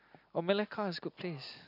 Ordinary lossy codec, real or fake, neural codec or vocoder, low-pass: none; real; none; 5.4 kHz